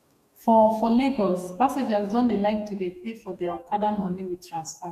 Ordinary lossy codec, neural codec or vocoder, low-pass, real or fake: none; codec, 44.1 kHz, 2.6 kbps, DAC; 14.4 kHz; fake